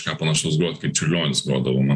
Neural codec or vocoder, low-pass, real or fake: none; 9.9 kHz; real